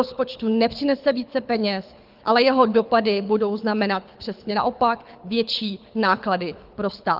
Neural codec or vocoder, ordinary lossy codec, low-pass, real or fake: codec, 24 kHz, 6 kbps, HILCodec; Opus, 32 kbps; 5.4 kHz; fake